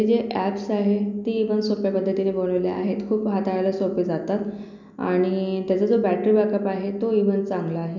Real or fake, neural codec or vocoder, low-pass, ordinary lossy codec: real; none; 7.2 kHz; none